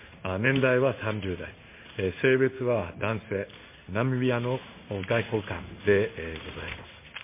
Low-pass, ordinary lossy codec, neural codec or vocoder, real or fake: 3.6 kHz; MP3, 24 kbps; codec, 16 kHz in and 24 kHz out, 1 kbps, XY-Tokenizer; fake